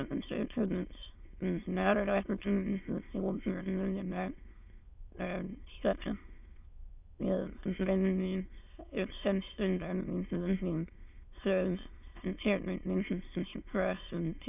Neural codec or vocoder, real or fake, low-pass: autoencoder, 22.05 kHz, a latent of 192 numbers a frame, VITS, trained on many speakers; fake; 3.6 kHz